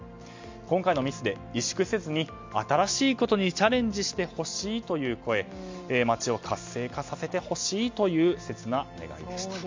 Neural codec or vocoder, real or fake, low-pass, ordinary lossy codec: none; real; 7.2 kHz; MP3, 64 kbps